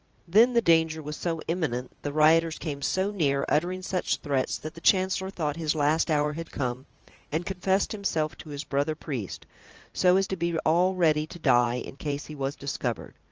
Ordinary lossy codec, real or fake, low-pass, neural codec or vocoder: Opus, 24 kbps; fake; 7.2 kHz; vocoder, 44.1 kHz, 80 mel bands, Vocos